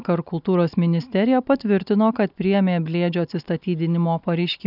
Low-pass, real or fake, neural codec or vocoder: 5.4 kHz; real; none